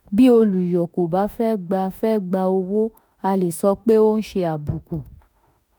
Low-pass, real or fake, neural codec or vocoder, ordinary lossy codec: none; fake; autoencoder, 48 kHz, 32 numbers a frame, DAC-VAE, trained on Japanese speech; none